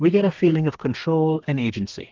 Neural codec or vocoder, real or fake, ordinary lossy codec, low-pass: codec, 32 kHz, 1.9 kbps, SNAC; fake; Opus, 32 kbps; 7.2 kHz